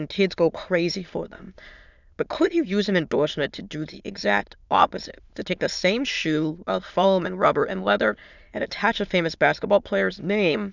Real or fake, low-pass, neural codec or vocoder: fake; 7.2 kHz; autoencoder, 22.05 kHz, a latent of 192 numbers a frame, VITS, trained on many speakers